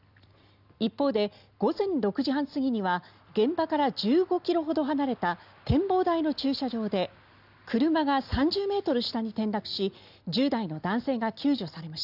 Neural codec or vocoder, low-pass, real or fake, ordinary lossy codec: none; 5.4 kHz; real; none